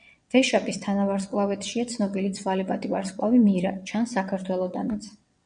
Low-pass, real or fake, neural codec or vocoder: 9.9 kHz; fake; vocoder, 22.05 kHz, 80 mel bands, WaveNeXt